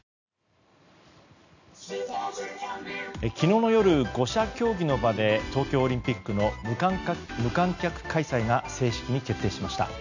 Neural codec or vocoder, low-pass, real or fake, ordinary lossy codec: none; 7.2 kHz; real; none